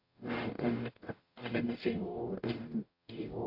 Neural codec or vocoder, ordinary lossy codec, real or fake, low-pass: codec, 44.1 kHz, 0.9 kbps, DAC; AAC, 32 kbps; fake; 5.4 kHz